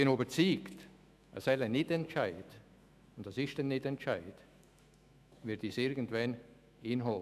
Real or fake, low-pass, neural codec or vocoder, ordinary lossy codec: fake; 14.4 kHz; autoencoder, 48 kHz, 128 numbers a frame, DAC-VAE, trained on Japanese speech; none